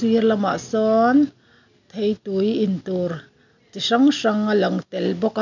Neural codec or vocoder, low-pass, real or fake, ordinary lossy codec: none; 7.2 kHz; real; none